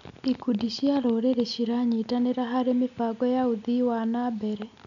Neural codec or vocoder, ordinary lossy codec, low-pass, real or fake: none; none; 7.2 kHz; real